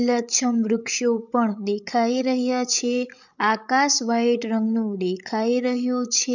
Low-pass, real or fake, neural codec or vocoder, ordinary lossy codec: 7.2 kHz; fake; codec, 16 kHz, 8 kbps, FreqCodec, larger model; none